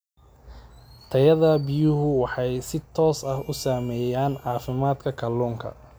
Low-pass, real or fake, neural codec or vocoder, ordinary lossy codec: none; real; none; none